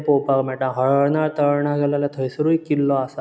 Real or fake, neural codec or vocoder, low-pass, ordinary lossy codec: real; none; none; none